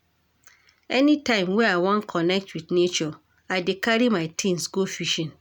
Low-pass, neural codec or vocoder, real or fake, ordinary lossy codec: none; none; real; none